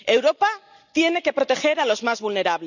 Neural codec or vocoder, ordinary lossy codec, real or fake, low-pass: none; none; real; 7.2 kHz